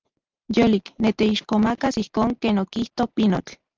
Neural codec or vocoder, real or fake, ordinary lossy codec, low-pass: none; real; Opus, 24 kbps; 7.2 kHz